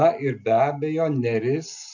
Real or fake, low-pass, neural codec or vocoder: real; 7.2 kHz; none